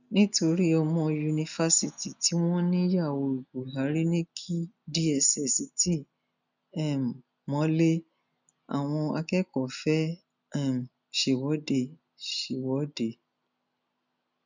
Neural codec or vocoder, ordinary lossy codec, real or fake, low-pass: none; none; real; 7.2 kHz